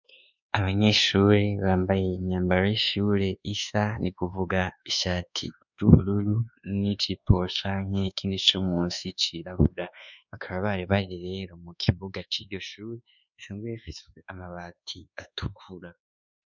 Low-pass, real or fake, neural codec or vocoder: 7.2 kHz; fake; codec, 24 kHz, 1.2 kbps, DualCodec